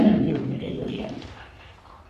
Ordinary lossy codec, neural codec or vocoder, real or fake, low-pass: MP3, 64 kbps; codec, 44.1 kHz, 3.4 kbps, Pupu-Codec; fake; 14.4 kHz